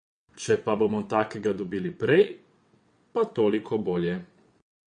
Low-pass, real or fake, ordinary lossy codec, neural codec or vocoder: 9.9 kHz; fake; MP3, 48 kbps; vocoder, 22.05 kHz, 80 mel bands, WaveNeXt